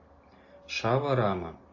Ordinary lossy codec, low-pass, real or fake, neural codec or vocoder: AAC, 48 kbps; 7.2 kHz; real; none